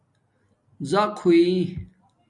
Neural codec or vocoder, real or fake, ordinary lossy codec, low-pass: none; real; MP3, 64 kbps; 10.8 kHz